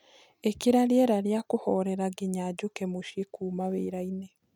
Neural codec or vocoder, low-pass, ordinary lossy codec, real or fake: none; 19.8 kHz; none; real